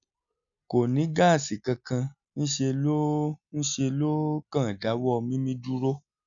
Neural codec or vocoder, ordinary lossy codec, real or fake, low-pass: none; none; real; 7.2 kHz